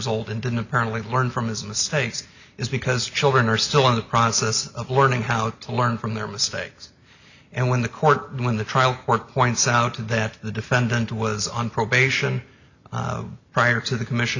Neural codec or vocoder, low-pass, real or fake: none; 7.2 kHz; real